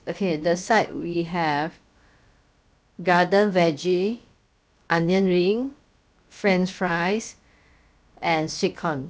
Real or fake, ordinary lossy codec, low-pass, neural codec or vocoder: fake; none; none; codec, 16 kHz, about 1 kbps, DyCAST, with the encoder's durations